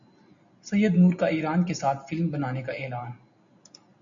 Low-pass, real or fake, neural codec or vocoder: 7.2 kHz; real; none